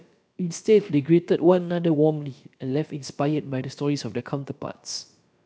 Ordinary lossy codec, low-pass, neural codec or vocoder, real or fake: none; none; codec, 16 kHz, about 1 kbps, DyCAST, with the encoder's durations; fake